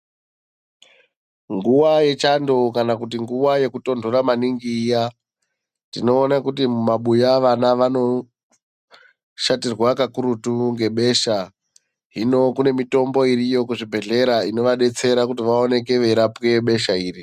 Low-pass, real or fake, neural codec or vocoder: 14.4 kHz; real; none